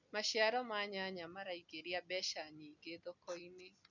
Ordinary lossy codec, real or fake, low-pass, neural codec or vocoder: none; real; 7.2 kHz; none